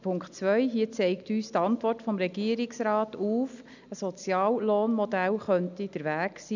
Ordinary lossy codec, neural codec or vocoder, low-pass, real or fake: none; none; 7.2 kHz; real